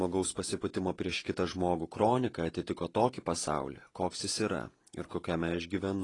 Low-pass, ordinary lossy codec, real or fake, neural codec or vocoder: 10.8 kHz; AAC, 32 kbps; fake; vocoder, 48 kHz, 128 mel bands, Vocos